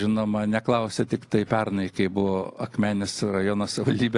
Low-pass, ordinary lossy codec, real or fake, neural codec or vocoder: 10.8 kHz; AAC, 48 kbps; real; none